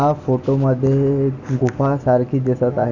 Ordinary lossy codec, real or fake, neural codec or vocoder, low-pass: none; real; none; 7.2 kHz